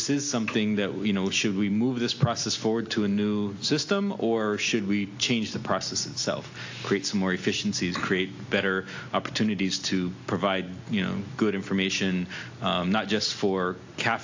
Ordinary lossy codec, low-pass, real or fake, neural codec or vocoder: AAC, 48 kbps; 7.2 kHz; real; none